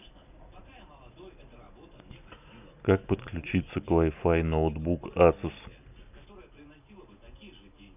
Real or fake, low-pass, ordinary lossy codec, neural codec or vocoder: real; 3.6 kHz; none; none